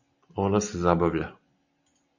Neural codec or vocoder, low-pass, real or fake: none; 7.2 kHz; real